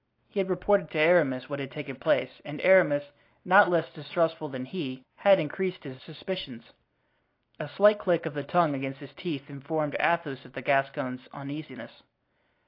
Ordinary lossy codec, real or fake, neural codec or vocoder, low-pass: AAC, 32 kbps; real; none; 5.4 kHz